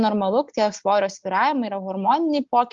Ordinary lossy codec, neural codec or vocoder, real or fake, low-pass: Opus, 32 kbps; none; real; 7.2 kHz